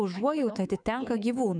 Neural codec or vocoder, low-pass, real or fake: codec, 24 kHz, 3.1 kbps, DualCodec; 9.9 kHz; fake